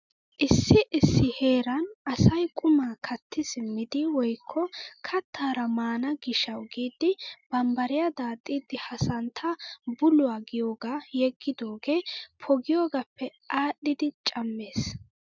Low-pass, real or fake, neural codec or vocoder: 7.2 kHz; real; none